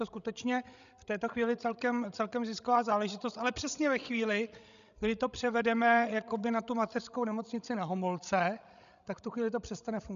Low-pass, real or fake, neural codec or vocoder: 7.2 kHz; fake; codec, 16 kHz, 16 kbps, FreqCodec, larger model